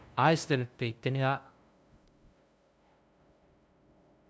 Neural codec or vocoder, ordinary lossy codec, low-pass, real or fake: codec, 16 kHz, 0.5 kbps, FunCodec, trained on LibriTTS, 25 frames a second; none; none; fake